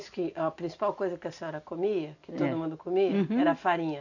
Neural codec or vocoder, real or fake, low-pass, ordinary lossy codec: none; real; 7.2 kHz; none